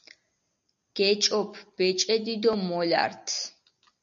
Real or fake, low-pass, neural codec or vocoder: real; 7.2 kHz; none